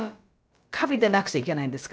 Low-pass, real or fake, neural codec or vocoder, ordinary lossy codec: none; fake; codec, 16 kHz, about 1 kbps, DyCAST, with the encoder's durations; none